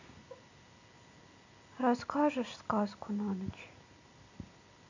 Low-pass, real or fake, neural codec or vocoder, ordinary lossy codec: 7.2 kHz; real; none; AAC, 48 kbps